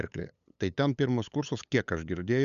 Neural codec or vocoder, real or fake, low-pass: codec, 16 kHz, 8 kbps, FunCodec, trained on LibriTTS, 25 frames a second; fake; 7.2 kHz